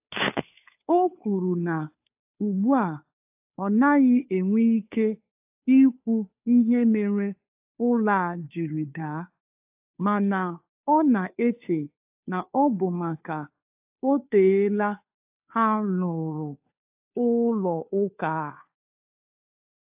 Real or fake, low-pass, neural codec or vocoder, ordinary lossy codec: fake; 3.6 kHz; codec, 16 kHz, 2 kbps, FunCodec, trained on Chinese and English, 25 frames a second; AAC, 32 kbps